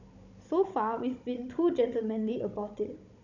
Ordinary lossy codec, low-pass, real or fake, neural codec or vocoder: none; 7.2 kHz; fake; codec, 16 kHz, 16 kbps, FunCodec, trained on Chinese and English, 50 frames a second